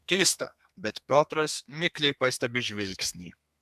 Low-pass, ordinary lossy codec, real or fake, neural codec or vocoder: 14.4 kHz; AAC, 96 kbps; fake; codec, 32 kHz, 1.9 kbps, SNAC